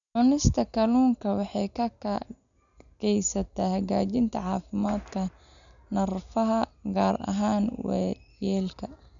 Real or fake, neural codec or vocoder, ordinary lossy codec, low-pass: real; none; none; 7.2 kHz